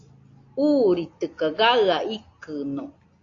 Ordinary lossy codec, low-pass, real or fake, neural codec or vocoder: AAC, 48 kbps; 7.2 kHz; real; none